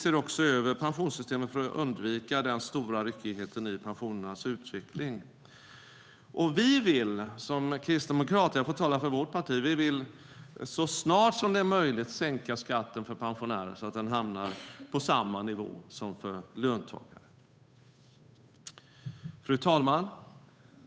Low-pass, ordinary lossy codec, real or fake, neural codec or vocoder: none; none; fake; codec, 16 kHz, 8 kbps, FunCodec, trained on Chinese and English, 25 frames a second